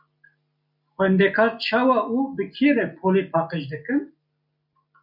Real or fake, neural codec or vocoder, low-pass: real; none; 5.4 kHz